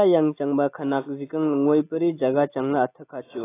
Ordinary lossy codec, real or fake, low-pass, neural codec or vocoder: AAC, 16 kbps; real; 3.6 kHz; none